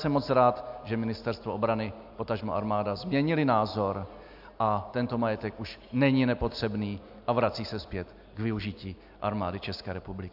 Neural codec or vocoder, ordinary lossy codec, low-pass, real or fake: none; AAC, 48 kbps; 5.4 kHz; real